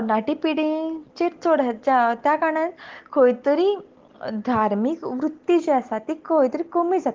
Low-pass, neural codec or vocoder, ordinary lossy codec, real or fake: 7.2 kHz; none; Opus, 16 kbps; real